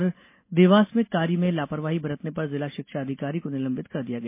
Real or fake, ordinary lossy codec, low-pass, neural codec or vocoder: real; MP3, 24 kbps; 3.6 kHz; none